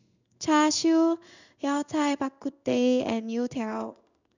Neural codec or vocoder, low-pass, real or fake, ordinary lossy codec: codec, 16 kHz in and 24 kHz out, 1 kbps, XY-Tokenizer; 7.2 kHz; fake; none